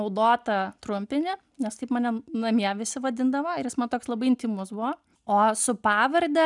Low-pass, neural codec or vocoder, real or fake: 10.8 kHz; none; real